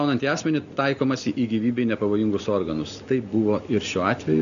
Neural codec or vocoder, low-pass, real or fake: none; 7.2 kHz; real